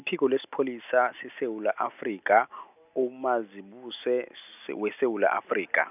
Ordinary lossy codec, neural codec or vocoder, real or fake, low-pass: none; none; real; 3.6 kHz